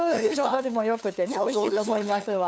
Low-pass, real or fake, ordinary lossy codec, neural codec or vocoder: none; fake; none; codec, 16 kHz, 2 kbps, FunCodec, trained on LibriTTS, 25 frames a second